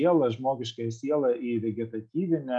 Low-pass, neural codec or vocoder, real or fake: 9.9 kHz; none; real